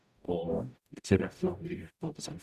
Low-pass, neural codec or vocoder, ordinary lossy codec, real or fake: 14.4 kHz; codec, 44.1 kHz, 0.9 kbps, DAC; none; fake